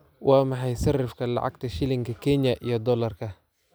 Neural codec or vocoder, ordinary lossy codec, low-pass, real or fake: none; none; none; real